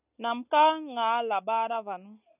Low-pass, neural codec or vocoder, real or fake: 3.6 kHz; none; real